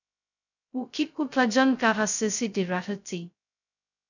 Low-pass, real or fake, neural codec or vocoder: 7.2 kHz; fake; codec, 16 kHz, 0.2 kbps, FocalCodec